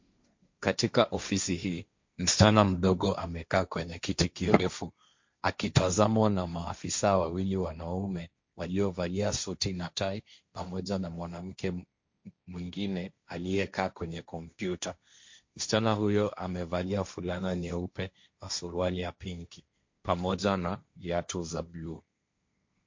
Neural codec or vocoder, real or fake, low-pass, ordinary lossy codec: codec, 16 kHz, 1.1 kbps, Voila-Tokenizer; fake; 7.2 kHz; MP3, 48 kbps